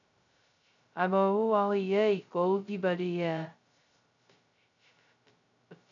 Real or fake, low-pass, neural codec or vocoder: fake; 7.2 kHz; codec, 16 kHz, 0.2 kbps, FocalCodec